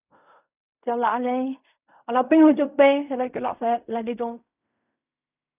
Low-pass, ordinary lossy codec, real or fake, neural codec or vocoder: 3.6 kHz; none; fake; codec, 16 kHz in and 24 kHz out, 0.4 kbps, LongCat-Audio-Codec, fine tuned four codebook decoder